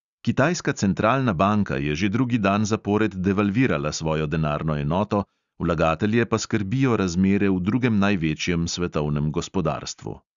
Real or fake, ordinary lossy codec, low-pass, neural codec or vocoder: real; Opus, 64 kbps; 7.2 kHz; none